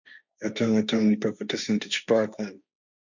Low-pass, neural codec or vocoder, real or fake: 7.2 kHz; codec, 16 kHz, 1.1 kbps, Voila-Tokenizer; fake